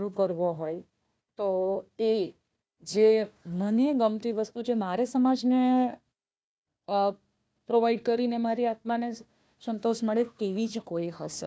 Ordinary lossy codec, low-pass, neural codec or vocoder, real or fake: none; none; codec, 16 kHz, 1 kbps, FunCodec, trained on Chinese and English, 50 frames a second; fake